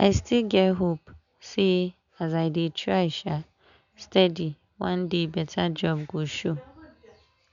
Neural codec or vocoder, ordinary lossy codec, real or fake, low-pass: none; none; real; 7.2 kHz